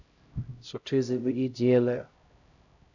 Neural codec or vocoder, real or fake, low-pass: codec, 16 kHz, 0.5 kbps, X-Codec, HuBERT features, trained on LibriSpeech; fake; 7.2 kHz